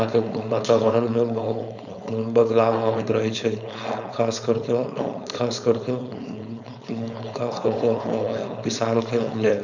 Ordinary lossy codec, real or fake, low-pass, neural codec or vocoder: none; fake; 7.2 kHz; codec, 16 kHz, 4.8 kbps, FACodec